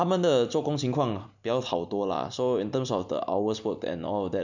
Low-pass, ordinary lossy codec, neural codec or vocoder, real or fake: 7.2 kHz; none; none; real